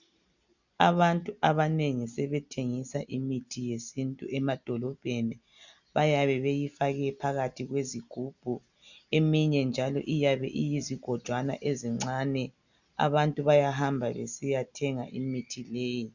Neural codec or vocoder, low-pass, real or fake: none; 7.2 kHz; real